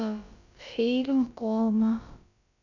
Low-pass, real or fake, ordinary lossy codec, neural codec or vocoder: 7.2 kHz; fake; none; codec, 16 kHz, about 1 kbps, DyCAST, with the encoder's durations